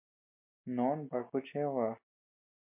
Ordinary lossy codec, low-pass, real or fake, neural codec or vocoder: MP3, 32 kbps; 3.6 kHz; real; none